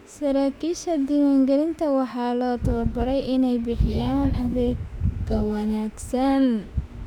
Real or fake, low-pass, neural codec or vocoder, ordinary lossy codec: fake; 19.8 kHz; autoencoder, 48 kHz, 32 numbers a frame, DAC-VAE, trained on Japanese speech; none